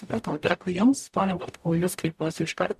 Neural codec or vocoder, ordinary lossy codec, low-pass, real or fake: codec, 44.1 kHz, 0.9 kbps, DAC; MP3, 96 kbps; 14.4 kHz; fake